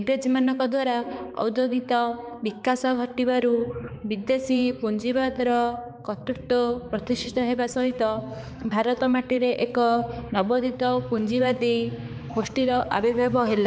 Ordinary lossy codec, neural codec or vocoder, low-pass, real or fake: none; codec, 16 kHz, 4 kbps, X-Codec, HuBERT features, trained on balanced general audio; none; fake